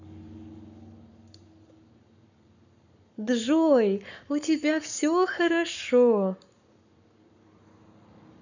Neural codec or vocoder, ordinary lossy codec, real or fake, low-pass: codec, 44.1 kHz, 7.8 kbps, Pupu-Codec; none; fake; 7.2 kHz